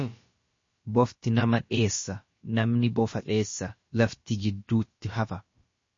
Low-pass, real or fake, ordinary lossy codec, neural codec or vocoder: 7.2 kHz; fake; MP3, 32 kbps; codec, 16 kHz, about 1 kbps, DyCAST, with the encoder's durations